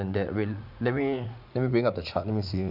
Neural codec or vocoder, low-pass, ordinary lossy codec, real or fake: vocoder, 44.1 kHz, 80 mel bands, Vocos; 5.4 kHz; none; fake